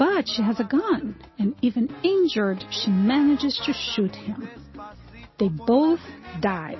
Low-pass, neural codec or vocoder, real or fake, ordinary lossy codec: 7.2 kHz; none; real; MP3, 24 kbps